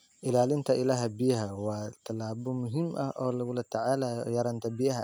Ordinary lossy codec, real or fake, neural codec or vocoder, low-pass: none; real; none; none